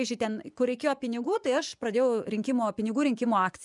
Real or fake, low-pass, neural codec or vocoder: fake; 10.8 kHz; vocoder, 24 kHz, 100 mel bands, Vocos